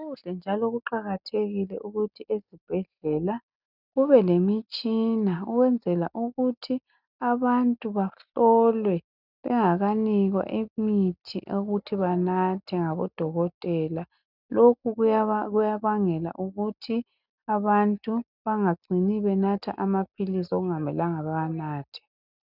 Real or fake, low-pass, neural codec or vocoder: real; 5.4 kHz; none